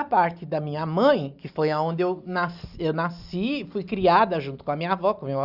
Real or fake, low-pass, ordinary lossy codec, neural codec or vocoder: real; 5.4 kHz; none; none